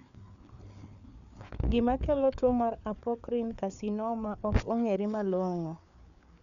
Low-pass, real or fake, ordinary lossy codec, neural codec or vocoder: 7.2 kHz; fake; none; codec, 16 kHz, 4 kbps, FreqCodec, larger model